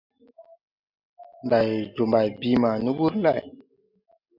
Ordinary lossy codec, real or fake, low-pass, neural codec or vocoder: AAC, 48 kbps; real; 5.4 kHz; none